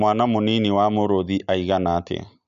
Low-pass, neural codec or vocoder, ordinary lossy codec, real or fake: 7.2 kHz; none; none; real